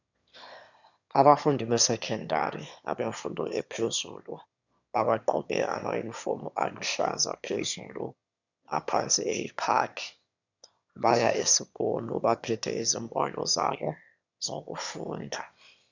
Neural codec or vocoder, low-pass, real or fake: autoencoder, 22.05 kHz, a latent of 192 numbers a frame, VITS, trained on one speaker; 7.2 kHz; fake